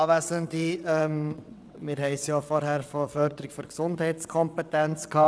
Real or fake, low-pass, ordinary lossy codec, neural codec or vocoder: fake; none; none; vocoder, 22.05 kHz, 80 mel bands, WaveNeXt